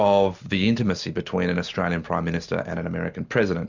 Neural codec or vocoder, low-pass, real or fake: none; 7.2 kHz; real